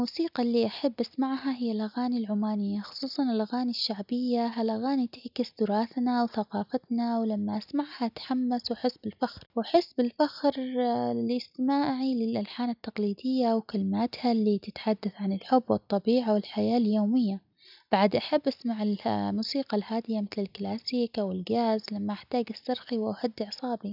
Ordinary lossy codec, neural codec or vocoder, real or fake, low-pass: none; none; real; 5.4 kHz